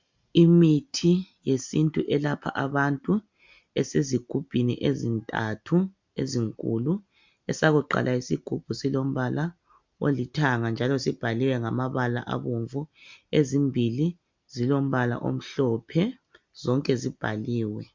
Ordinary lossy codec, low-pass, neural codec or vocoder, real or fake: MP3, 64 kbps; 7.2 kHz; none; real